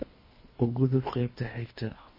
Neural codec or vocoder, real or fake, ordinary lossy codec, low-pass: codec, 24 kHz, 3 kbps, HILCodec; fake; MP3, 24 kbps; 5.4 kHz